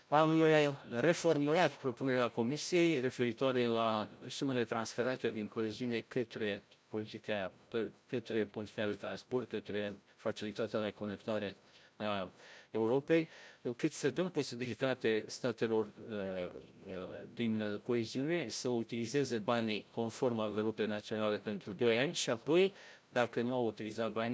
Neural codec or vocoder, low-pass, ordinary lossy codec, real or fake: codec, 16 kHz, 0.5 kbps, FreqCodec, larger model; none; none; fake